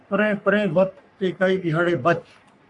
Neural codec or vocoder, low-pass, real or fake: codec, 44.1 kHz, 3.4 kbps, Pupu-Codec; 10.8 kHz; fake